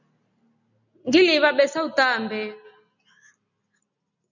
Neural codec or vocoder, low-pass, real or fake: none; 7.2 kHz; real